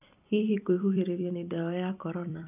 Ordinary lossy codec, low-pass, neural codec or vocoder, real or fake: none; 3.6 kHz; vocoder, 44.1 kHz, 128 mel bands every 256 samples, BigVGAN v2; fake